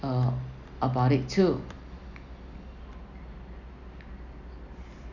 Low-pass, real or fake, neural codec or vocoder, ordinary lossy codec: 7.2 kHz; real; none; none